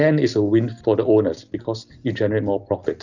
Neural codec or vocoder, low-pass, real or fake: none; 7.2 kHz; real